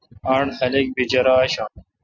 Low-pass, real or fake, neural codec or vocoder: 7.2 kHz; real; none